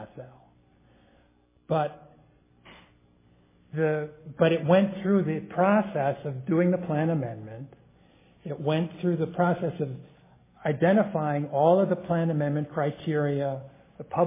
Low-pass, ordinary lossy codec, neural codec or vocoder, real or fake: 3.6 kHz; MP3, 16 kbps; none; real